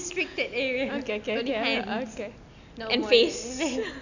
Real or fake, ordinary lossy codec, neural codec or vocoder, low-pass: real; none; none; 7.2 kHz